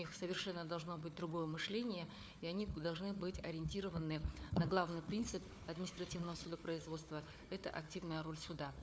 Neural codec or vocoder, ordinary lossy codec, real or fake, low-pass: codec, 16 kHz, 4 kbps, FunCodec, trained on Chinese and English, 50 frames a second; none; fake; none